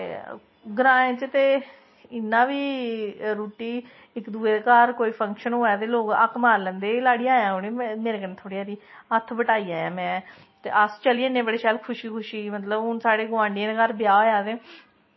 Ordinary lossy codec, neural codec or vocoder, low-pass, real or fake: MP3, 24 kbps; none; 7.2 kHz; real